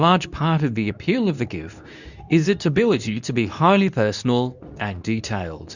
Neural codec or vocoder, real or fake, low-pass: codec, 24 kHz, 0.9 kbps, WavTokenizer, medium speech release version 2; fake; 7.2 kHz